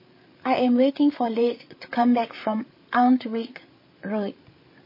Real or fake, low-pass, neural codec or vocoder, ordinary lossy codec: fake; 5.4 kHz; codec, 16 kHz, 8 kbps, FreqCodec, larger model; MP3, 24 kbps